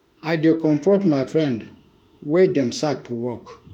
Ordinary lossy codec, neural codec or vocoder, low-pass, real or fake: none; autoencoder, 48 kHz, 32 numbers a frame, DAC-VAE, trained on Japanese speech; 19.8 kHz; fake